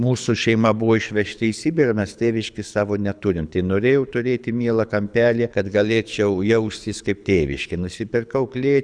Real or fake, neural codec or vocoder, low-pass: fake; codec, 24 kHz, 6 kbps, HILCodec; 9.9 kHz